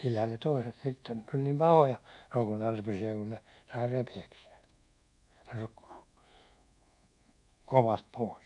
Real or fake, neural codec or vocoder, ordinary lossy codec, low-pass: fake; codec, 24 kHz, 1.2 kbps, DualCodec; MP3, 96 kbps; 10.8 kHz